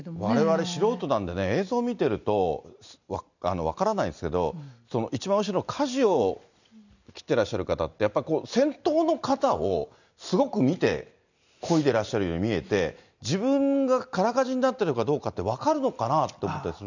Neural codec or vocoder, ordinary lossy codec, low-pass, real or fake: none; none; 7.2 kHz; real